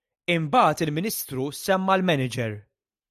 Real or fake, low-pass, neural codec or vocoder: real; 14.4 kHz; none